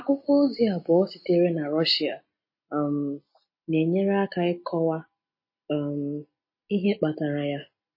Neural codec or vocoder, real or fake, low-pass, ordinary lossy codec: none; real; 5.4 kHz; MP3, 24 kbps